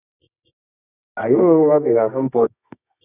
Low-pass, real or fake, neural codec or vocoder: 3.6 kHz; fake; codec, 24 kHz, 0.9 kbps, WavTokenizer, medium music audio release